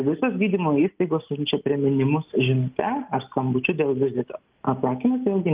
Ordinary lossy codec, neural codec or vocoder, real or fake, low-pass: Opus, 32 kbps; none; real; 3.6 kHz